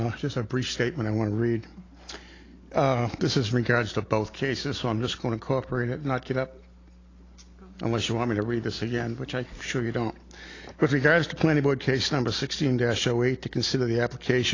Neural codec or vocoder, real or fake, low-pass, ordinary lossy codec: none; real; 7.2 kHz; AAC, 32 kbps